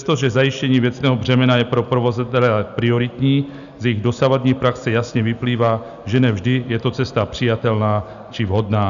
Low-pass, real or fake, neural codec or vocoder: 7.2 kHz; real; none